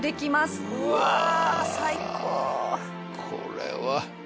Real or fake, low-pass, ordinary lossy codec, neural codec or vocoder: real; none; none; none